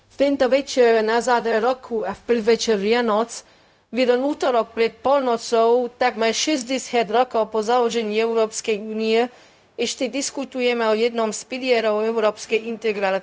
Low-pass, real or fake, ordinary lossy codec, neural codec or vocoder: none; fake; none; codec, 16 kHz, 0.4 kbps, LongCat-Audio-Codec